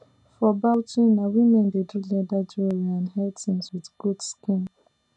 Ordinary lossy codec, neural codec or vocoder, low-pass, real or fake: none; none; 10.8 kHz; real